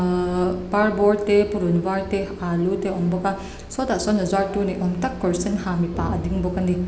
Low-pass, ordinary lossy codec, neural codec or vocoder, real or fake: none; none; none; real